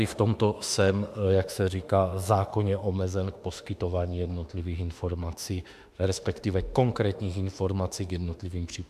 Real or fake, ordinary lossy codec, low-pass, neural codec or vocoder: fake; AAC, 96 kbps; 14.4 kHz; autoencoder, 48 kHz, 32 numbers a frame, DAC-VAE, trained on Japanese speech